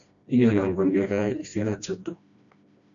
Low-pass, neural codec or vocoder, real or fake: 7.2 kHz; codec, 16 kHz, 1 kbps, FreqCodec, smaller model; fake